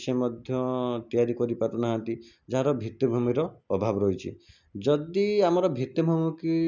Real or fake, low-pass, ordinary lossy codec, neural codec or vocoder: real; 7.2 kHz; none; none